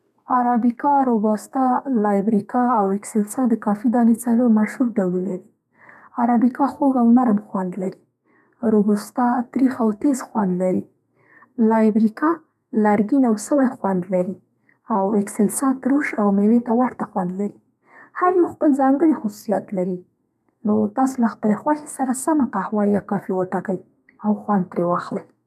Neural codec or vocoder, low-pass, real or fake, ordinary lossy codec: codec, 32 kHz, 1.9 kbps, SNAC; 14.4 kHz; fake; none